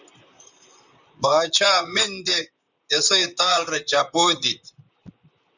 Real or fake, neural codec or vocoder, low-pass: fake; vocoder, 44.1 kHz, 128 mel bands, Pupu-Vocoder; 7.2 kHz